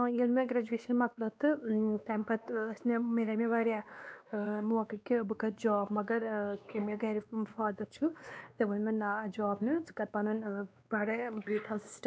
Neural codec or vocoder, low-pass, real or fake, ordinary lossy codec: codec, 16 kHz, 2 kbps, X-Codec, WavLM features, trained on Multilingual LibriSpeech; none; fake; none